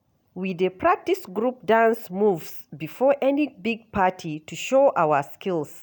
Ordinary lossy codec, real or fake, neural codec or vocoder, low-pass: none; real; none; none